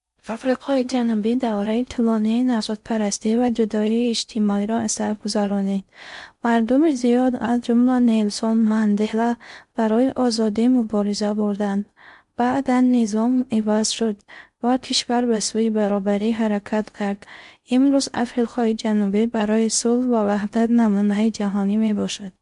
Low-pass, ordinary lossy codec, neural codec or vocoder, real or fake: 10.8 kHz; MP3, 64 kbps; codec, 16 kHz in and 24 kHz out, 0.6 kbps, FocalCodec, streaming, 4096 codes; fake